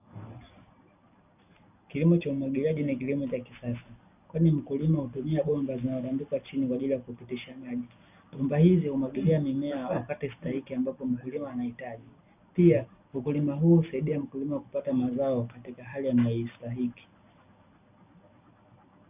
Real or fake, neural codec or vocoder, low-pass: real; none; 3.6 kHz